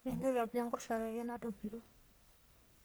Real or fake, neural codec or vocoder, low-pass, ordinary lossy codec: fake; codec, 44.1 kHz, 1.7 kbps, Pupu-Codec; none; none